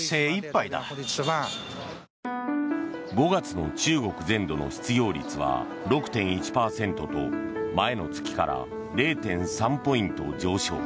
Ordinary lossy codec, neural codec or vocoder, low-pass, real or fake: none; none; none; real